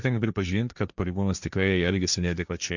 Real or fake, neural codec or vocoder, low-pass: fake; codec, 16 kHz, 1.1 kbps, Voila-Tokenizer; 7.2 kHz